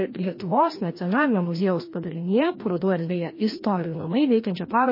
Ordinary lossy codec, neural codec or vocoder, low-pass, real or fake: MP3, 24 kbps; codec, 16 kHz, 1 kbps, FreqCodec, larger model; 5.4 kHz; fake